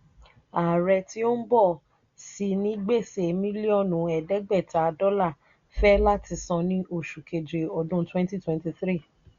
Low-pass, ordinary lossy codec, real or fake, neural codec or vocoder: 7.2 kHz; Opus, 64 kbps; real; none